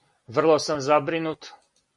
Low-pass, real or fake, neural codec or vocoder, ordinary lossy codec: 10.8 kHz; real; none; MP3, 48 kbps